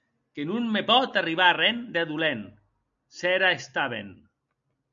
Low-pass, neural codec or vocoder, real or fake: 7.2 kHz; none; real